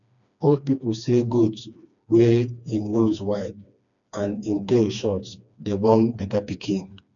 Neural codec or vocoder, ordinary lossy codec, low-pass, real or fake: codec, 16 kHz, 2 kbps, FreqCodec, smaller model; none; 7.2 kHz; fake